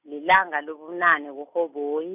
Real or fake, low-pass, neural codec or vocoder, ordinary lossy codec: real; 3.6 kHz; none; none